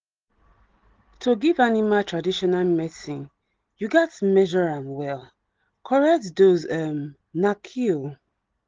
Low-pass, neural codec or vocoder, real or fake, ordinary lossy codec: 7.2 kHz; none; real; Opus, 16 kbps